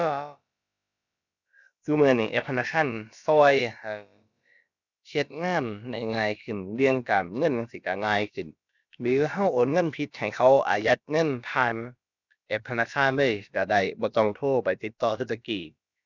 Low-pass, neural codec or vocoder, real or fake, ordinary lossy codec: 7.2 kHz; codec, 16 kHz, about 1 kbps, DyCAST, with the encoder's durations; fake; none